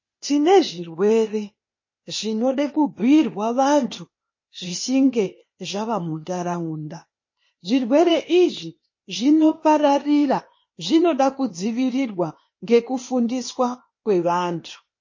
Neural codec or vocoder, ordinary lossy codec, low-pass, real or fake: codec, 16 kHz, 0.8 kbps, ZipCodec; MP3, 32 kbps; 7.2 kHz; fake